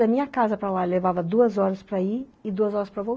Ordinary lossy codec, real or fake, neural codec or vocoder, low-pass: none; real; none; none